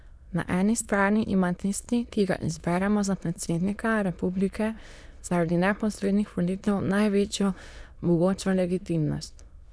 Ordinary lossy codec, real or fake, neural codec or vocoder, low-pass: none; fake; autoencoder, 22.05 kHz, a latent of 192 numbers a frame, VITS, trained on many speakers; none